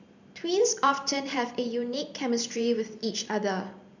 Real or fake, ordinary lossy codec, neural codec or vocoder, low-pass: fake; none; vocoder, 44.1 kHz, 128 mel bands every 512 samples, BigVGAN v2; 7.2 kHz